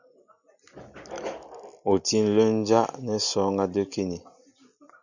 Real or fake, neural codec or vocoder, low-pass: real; none; 7.2 kHz